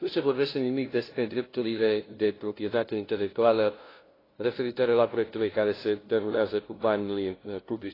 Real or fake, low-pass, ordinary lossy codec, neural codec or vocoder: fake; 5.4 kHz; AAC, 24 kbps; codec, 16 kHz, 0.5 kbps, FunCodec, trained on LibriTTS, 25 frames a second